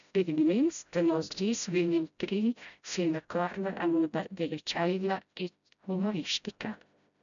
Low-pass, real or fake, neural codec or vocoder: 7.2 kHz; fake; codec, 16 kHz, 0.5 kbps, FreqCodec, smaller model